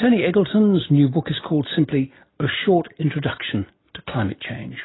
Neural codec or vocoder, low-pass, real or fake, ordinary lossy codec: none; 7.2 kHz; real; AAC, 16 kbps